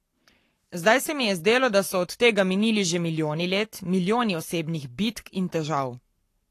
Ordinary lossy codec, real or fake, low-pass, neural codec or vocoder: AAC, 48 kbps; fake; 14.4 kHz; codec, 44.1 kHz, 7.8 kbps, Pupu-Codec